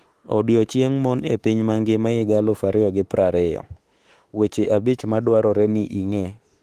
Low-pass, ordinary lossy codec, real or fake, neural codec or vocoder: 14.4 kHz; Opus, 24 kbps; fake; autoencoder, 48 kHz, 32 numbers a frame, DAC-VAE, trained on Japanese speech